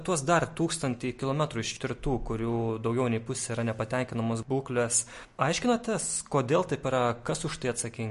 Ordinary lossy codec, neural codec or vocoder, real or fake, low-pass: MP3, 48 kbps; none; real; 14.4 kHz